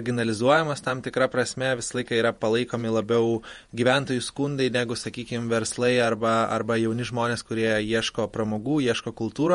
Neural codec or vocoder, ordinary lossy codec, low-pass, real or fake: vocoder, 48 kHz, 128 mel bands, Vocos; MP3, 48 kbps; 19.8 kHz; fake